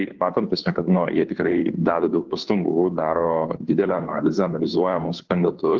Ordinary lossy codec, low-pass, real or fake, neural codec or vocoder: Opus, 16 kbps; 7.2 kHz; fake; codec, 16 kHz, 2 kbps, FunCodec, trained on Chinese and English, 25 frames a second